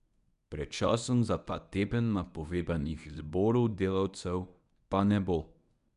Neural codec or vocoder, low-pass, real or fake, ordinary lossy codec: codec, 24 kHz, 0.9 kbps, WavTokenizer, medium speech release version 1; 10.8 kHz; fake; none